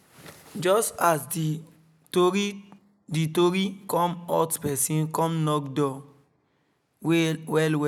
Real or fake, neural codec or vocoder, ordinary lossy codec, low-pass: real; none; none; 19.8 kHz